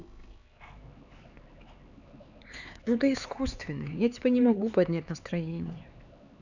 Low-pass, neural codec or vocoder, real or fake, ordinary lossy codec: 7.2 kHz; codec, 16 kHz, 4 kbps, X-Codec, HuBERT features, trained on LibriSpeech; fake; none